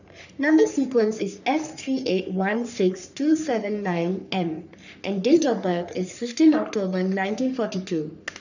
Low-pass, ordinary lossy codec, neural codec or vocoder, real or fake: 7.2 kHz; none; codec, 44.1 kHz, 3.4 kbps, Pupu-Codec; fake